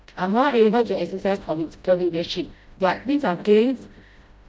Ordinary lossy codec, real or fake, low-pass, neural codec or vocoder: none; fake; none; codec, 16 kHz, 0.5 kbps, FreqCodec, smaller model